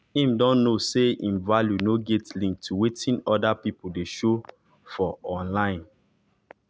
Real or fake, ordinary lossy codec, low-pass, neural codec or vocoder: real; none; none; none